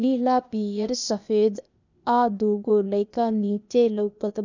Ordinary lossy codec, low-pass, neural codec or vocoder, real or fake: none; 7.2 kHz; codec, 16 kHz, 0.8 kbps, ZipCodec; fake